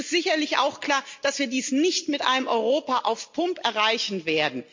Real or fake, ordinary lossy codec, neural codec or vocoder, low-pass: real; MP3, 48 kbps; none; 7.2 kHz